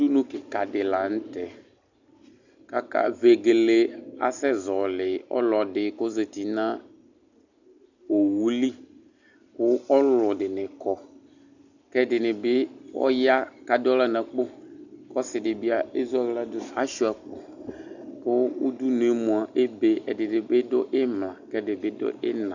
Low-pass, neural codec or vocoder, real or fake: 7.2 kHz; none; real